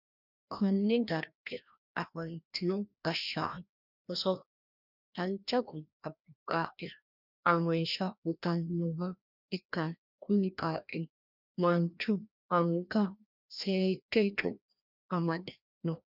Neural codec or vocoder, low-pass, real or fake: codec, 16 kHz, 1 kbps, FreqCodec, larger model; 5.4 kHz; fake